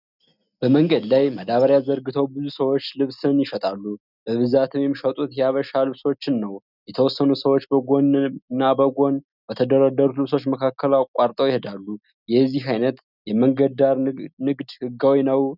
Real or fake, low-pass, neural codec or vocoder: real; 5.4 kHz; none